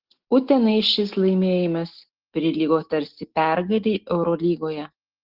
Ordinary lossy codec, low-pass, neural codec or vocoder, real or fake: Opus, 16 kbps; 5.4 kHz; none; real